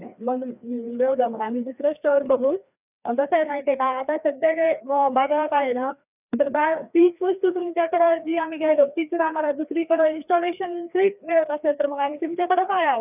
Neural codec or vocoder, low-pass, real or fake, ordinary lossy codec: codec, 16 kHz, 2 kbps, FreqCodec, larger model; 3.6 kHz; fake; none